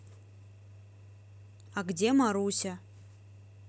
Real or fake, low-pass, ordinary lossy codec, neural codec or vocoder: real; none; none; none